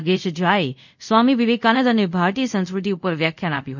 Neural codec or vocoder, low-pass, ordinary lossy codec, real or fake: codec, 24 kHz, 0.5 kbps, DualCodec; 7.2 kHz; none; fake